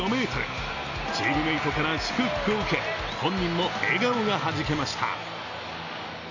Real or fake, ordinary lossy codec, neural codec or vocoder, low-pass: real; none; none; 7.2 kHz